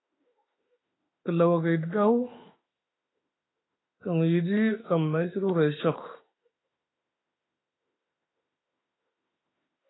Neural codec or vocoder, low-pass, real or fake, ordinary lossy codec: autoencoder, 48 kHz, 32 numbers a frame, DAC-VAE, trained on Japanese speech; 7.2 kHz; fake; AAC, 16 kbps